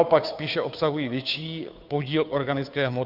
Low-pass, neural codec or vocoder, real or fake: 5.4 kHz; codec, 16 kHz, 2 kbps, FunCodec, trained on Chinese and English, 25 frames a second; fake